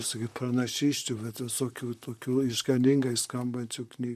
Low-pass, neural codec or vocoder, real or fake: 14.4 kHz; vocoder, 44.1 kHz, 128 mel bands, Pupu-Vocoder; fake